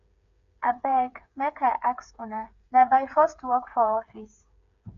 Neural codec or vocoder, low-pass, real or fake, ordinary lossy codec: codec, 16 kHz, 8 kbps, FreqCodec, smaller model; 7.2 kHz; fake; none